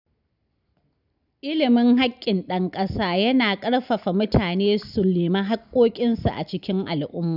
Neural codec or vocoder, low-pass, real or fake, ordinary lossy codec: none; 5.4 kHz; real; none